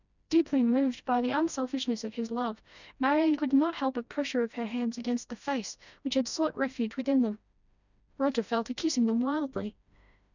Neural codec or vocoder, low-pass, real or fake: codec, 16 kHz, 1 kbps, FreqCodec, smaller model; 7.2 kHz; fake